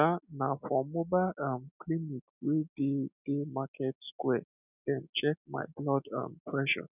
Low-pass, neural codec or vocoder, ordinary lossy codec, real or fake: 3.6 kHz; none; none; real